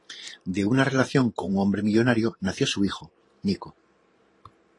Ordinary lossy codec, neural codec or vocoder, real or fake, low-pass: AAC, 32 kbps; vocoder, 44.1 kHz, 128 mel bands every 512 samples, BigVGAN v2; fake; 10.8 kHz